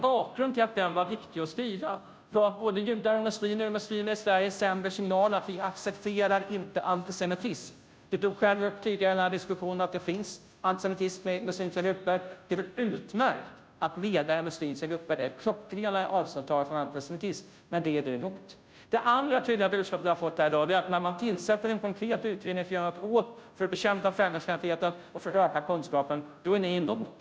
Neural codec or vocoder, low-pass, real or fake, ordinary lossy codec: codec, 16 kHz, 0.5 kbps, FunCodec, trained on Chinese and English, 25 frames a second; none; fake; none